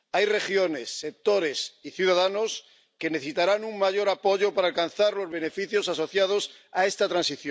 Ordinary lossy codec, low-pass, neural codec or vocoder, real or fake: none; none; none; real